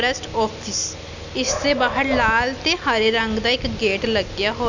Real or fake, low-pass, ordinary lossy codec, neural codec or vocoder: real; 7.2 kHz; none; none